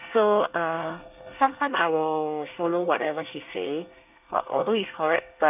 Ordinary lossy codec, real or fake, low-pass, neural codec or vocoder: none; fake; 3.6 kHz; codec, 24 kHz, 1 kbps, SNAC